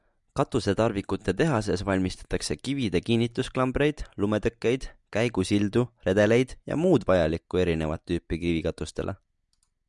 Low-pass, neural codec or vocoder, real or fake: 10.8 kHz; none; real